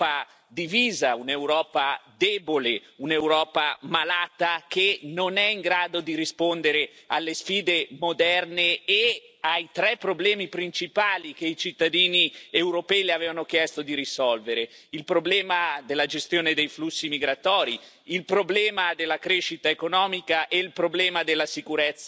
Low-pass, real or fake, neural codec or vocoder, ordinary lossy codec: none; real; none; none